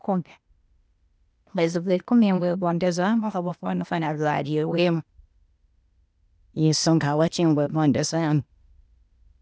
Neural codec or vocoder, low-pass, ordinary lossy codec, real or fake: codec, 16 kHz, 0.8 kbps, ZipCodec; none; none; fake